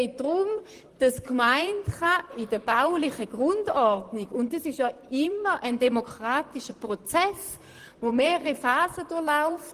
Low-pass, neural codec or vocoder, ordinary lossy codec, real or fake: 14.4 kHz; vocoder, 44.1 kHz, 128 mel bands, Pupu-Vocoder; Opus, 24 kbps; fake